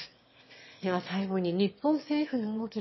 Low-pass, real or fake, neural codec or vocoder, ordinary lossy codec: 7.2 kHz; fake; autoencoder, 22.05 kHz, a latent of 192 numbers a frame, VITS, trained on one speaker; MP3, 24 kbps